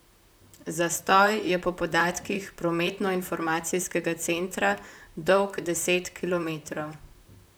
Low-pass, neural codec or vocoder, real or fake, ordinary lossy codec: none; vocoder, 44.1 kHz, 128 mel bands, Pupu-Vocoder; fake; none